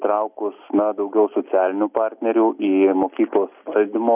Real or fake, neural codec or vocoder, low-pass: real; none; 3.6 kHz